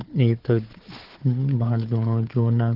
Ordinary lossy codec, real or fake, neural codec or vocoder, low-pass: Opus, 16 kbps; fake; codec, 16 kHz, 4 kbps, FunCodec, trained on Chinese and English, 50 frames a second; 5.4 kHz